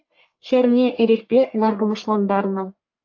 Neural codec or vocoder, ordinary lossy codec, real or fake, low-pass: codec, 44.1 kHz, 1.7 kbps, Pupu-Codec; AAC, 48 kbps; fake; 7.2 kHz